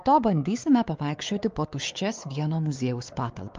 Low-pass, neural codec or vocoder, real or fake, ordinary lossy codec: 7.2 kHz; codec, 16 kHz, 4 kbps, X-Codec, WavLM features, trained on Multilingual LibriSpeech; fake; Opus, 32 kbps